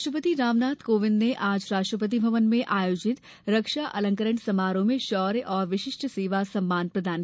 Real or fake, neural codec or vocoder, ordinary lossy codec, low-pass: real; none; none; none